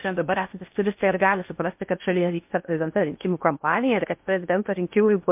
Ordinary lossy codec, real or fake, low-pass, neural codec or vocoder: MP3, 32 kbps; fake; 3.6 kHz; codec, 16 kHz in and 24 kHz out, 0.6 kbps, FocalCodec, streaming, 2048 codes